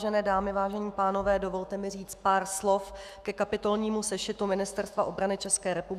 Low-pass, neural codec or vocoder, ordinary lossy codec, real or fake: 14.4 kHz; autoencoder, 48 kHz, 128 numbers a frame, DAC-VAE, trained on Japanese speech; Opus, 64 kbps; fake